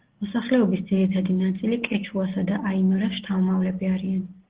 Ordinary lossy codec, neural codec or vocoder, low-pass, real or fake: Opus, 16 kbps; none; 3.6 kHz; real